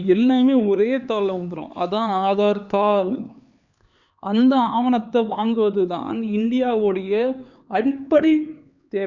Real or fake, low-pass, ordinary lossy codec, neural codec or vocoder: fake; 7.2 kHz; Opus, 64 kbps; codec, 16 kHz, 4 kbps, X-Codec, HuBERT features, trained on LibriSpeech